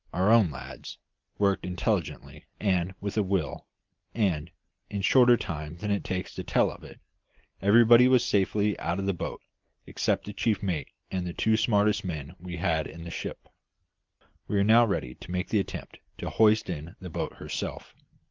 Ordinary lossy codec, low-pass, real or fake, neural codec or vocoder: Opus, 32 kbps; 7.2 kHz; real; none